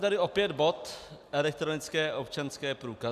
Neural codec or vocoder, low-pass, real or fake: none; 14.4 kHz; real